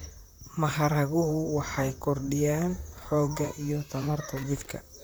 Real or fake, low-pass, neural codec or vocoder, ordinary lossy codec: fake; none; vocoder, 44.1 kHz, 128 mel bands, Pupu-Vocoder; none